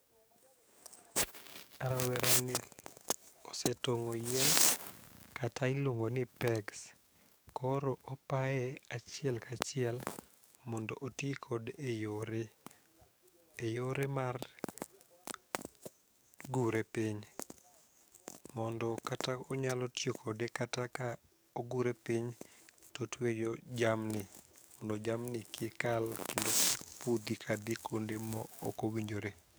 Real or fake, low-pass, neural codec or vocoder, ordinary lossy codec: fake; none; codec, 44.1 kHz, 7.8 kbps, DAC; none